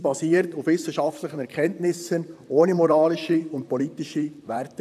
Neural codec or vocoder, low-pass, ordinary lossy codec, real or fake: vocoder, 44.1 kHz, 128 mel bands, Pupu-Vocoder; 14.4 kHz; AAC, 96 kbps; fake